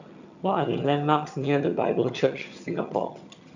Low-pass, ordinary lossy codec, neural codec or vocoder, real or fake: 7.2 kHz; none; vocoder, 22.05 kHz, 80 mel bands, HiFi-GAN; fake